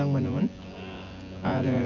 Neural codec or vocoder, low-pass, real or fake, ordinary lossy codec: vocoder, 24 kHz, 100 mel bands, Vocos; 7.2 kHz; fake; none